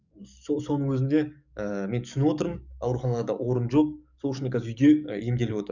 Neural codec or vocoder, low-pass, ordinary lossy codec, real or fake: codec, 44.1 kHz, 7.8 kbps, DAC; 7.2 kHz; none; fake